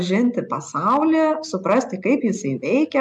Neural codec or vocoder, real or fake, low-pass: none; real; 10.8 kHz